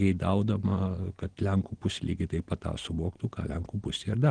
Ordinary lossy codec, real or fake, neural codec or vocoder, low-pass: Opus, 16 kbps; real; none; 9.9 kHz